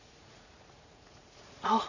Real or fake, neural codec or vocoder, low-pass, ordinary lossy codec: fake; vocoder, 44.1 kHz, 80 mel bands, Vocos; 7.2 kHz; none